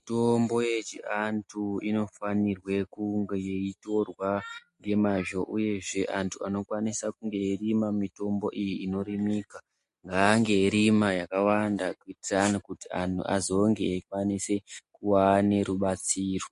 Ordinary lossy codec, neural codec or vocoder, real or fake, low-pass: MP3, 48 kbps; none; real; 14.4 kHz